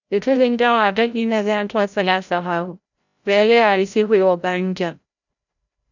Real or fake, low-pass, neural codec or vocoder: fake; 7.2 kHz; codec, 16 kHz, 0.5 kbps, FreqCodec, larger model